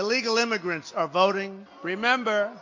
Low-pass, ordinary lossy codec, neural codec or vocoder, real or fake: 7.2 kHz; MP3, 48 kbps; none; real